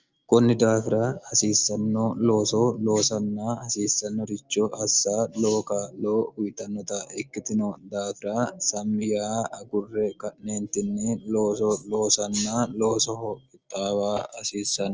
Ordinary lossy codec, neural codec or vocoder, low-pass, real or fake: Opus, 24 kbps; none; 7.2 kHz; real